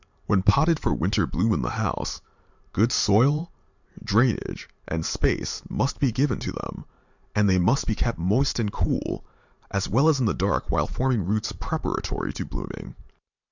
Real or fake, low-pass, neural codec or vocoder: fake; 7.2 kHz; vocoder, 44.1 kHz, 128 mel bands every 512 samples, BigVGAN v2